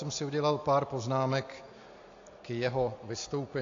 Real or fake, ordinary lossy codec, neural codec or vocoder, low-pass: real; AAC, 48 kbps; none; 7.2 kHz